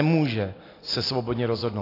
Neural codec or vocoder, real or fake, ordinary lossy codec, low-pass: none; real; AAC, 24 kbps; 5.4 kHz